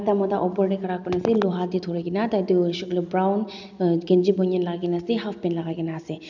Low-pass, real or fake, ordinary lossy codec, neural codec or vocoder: 7.2 kHz; real; none; none